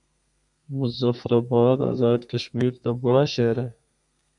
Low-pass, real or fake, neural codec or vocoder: 10.8 kHz; fake; codec, 32 kHz, 1.9 kbps, SNAC